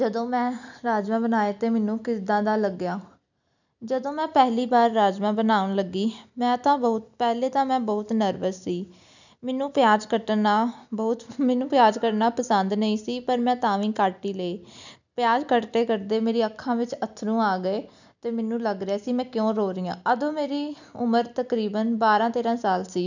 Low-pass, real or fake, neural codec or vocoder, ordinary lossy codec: 7.2 kHz; real; none; none